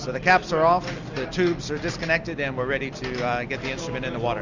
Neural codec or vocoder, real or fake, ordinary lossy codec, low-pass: none; real; Opus, 64 kbps; 7.2 kHz